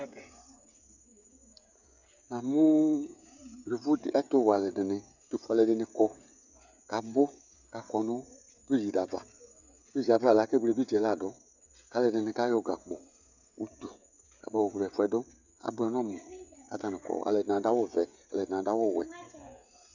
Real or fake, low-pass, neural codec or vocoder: fake; 7.2 kHz; codec, 16 kHz, 16 kbps, FreqCodec, smaller model